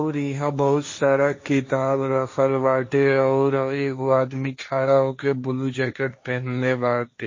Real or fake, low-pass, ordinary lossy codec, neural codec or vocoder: fake; 7.2 kHz; MP3, 32 kbps; codec, 16 kHz, 1.1 kbps, Voila-Tokenizer